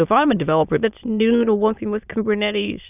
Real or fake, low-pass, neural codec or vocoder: fake; 3.6 kHz; autoencoder, 22.05 kHz, a latent of 192 numbers a frame, VITS, trained on many speakers